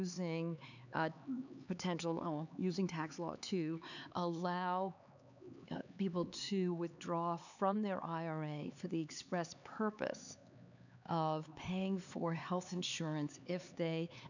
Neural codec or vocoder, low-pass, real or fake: codec, 16 kHz, 4 kbps, X-Codec, HuBERT features, trained on LibriSpeech; 7.2 kHz; fake